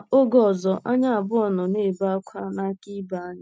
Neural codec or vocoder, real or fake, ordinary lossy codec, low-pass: none; real; none; none